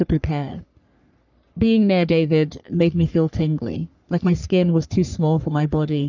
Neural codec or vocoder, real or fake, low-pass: codec, 44.1 kHz, 3.4 kbps, Pupu-Codec; fake; 7.2 kHz